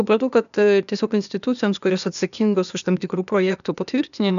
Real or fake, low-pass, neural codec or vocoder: fake; 7.2 kHz; codec, 16 kHz, 0.8 kbps, ZipCodec